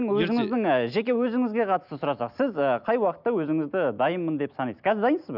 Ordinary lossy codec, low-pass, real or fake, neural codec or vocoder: none; 5.4 kHz; real; none